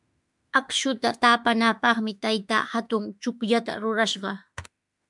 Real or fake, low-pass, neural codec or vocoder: fake; 10.8 kHz; autoencoder, 48 kHz, 32 numbers a frame, DAC-VAE, trained on Japanese speech